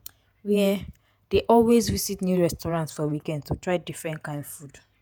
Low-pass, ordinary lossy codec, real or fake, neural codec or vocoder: none; none; fake; vocoder, 48 kHz, 128 mel bands, Vocos